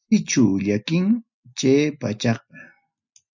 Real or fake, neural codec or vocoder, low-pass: real; none; 7.2 kHz